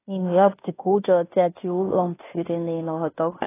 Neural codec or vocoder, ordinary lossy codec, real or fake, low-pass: codec, 16 kHz in and 24 kHz out, 0.9 kbps, LongCat-Audio-Codec, fine tuned four codebook decoder; AAC, 16 kbps; fake; 3.6 kHz